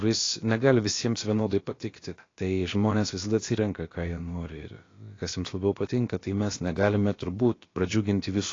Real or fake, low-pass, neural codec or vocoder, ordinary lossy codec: fake; 7.2 kHz; codec, 16 kHz, about 1 kbps, DyCAST, with the encoder's durations; AAC, 32 kbps